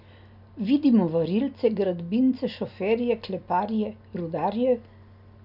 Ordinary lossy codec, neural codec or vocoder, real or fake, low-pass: none; none; real; 5.4 kHz